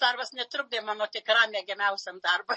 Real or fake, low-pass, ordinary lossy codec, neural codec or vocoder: real; 10.8 kHz; MP3, 32 kbps; none